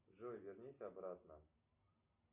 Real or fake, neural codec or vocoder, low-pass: real; none; 3.6 kHz